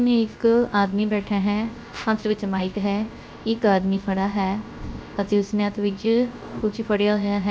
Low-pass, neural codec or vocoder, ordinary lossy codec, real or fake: none; codec, 16 kHz, 0.3 kbps, FocalCodec; none; fake